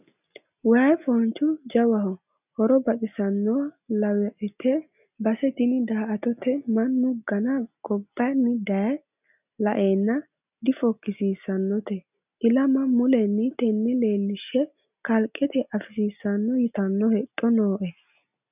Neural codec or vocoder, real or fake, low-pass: none; real; 3.6 kHz